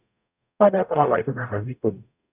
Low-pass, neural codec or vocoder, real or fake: 3.6 kHz; codec, 44.1 kHz, 0.9 kbps, DAC; fake